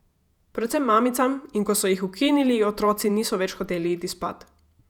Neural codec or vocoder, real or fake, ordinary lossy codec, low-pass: vocoder, 48 kHz, 128 mel bands, Vocos; fake; none; 19.8 kHz